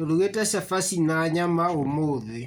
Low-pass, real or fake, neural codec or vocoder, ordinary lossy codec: none; real; none; none